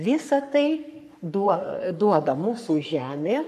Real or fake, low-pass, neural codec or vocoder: fake; 14.4 kHz; codec, 44.1 kHz, 3.4 kbps, Pupu-Codec